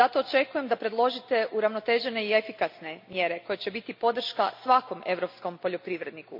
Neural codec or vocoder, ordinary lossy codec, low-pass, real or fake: none; MP3, 48 kbps; 5.4 kHz; real